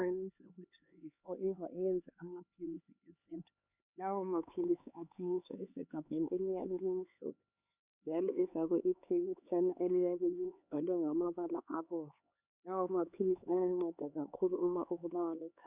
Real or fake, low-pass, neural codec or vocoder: fake; 3.6 kHz; codec, 16 kHz, 4 kbps, X-Codec, HuBERT features, trained on LibriSpeech